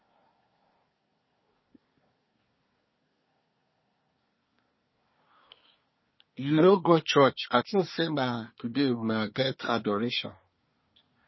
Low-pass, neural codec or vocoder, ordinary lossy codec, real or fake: 7.2 kHz; codec, 24 kHz, 1 kbps, SNAC; MP3, 24 kbps; fake